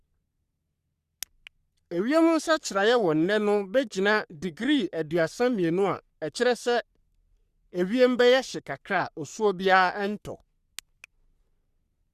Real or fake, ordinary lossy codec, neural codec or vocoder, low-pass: fake; Opus, 64 kbps; codec, 44.1 kHz, 3.4 kbps, Pupu-Codec; 14.4 kHz